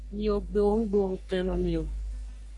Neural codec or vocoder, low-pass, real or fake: codec, 44.1 kHz, 3.4 kbps, Pupu-Codec; 10.8 kHz; fake